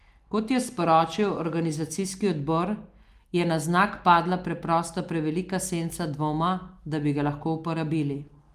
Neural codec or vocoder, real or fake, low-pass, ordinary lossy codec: none; real; 14.4 kHz; Opus, 32 kbps